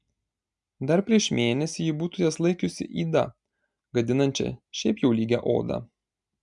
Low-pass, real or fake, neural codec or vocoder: 10.8 kHz; real; none